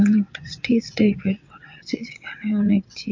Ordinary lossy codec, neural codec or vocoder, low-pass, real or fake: MP3, 48 kbps; vocoder, 22.05 kHz, 80 mel bands, WaveNeXt; 7.2 kHz; fake